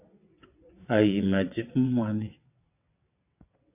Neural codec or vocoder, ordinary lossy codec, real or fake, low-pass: vocoder, 22.05 kHz, 80 mel bands, WaveNeXt; AAC, 32 kbps; fake; 3.6 kHz